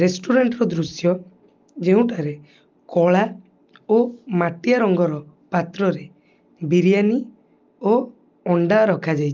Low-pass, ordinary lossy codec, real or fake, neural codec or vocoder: 7.2 kHz; Opus, 32 kbps; real; none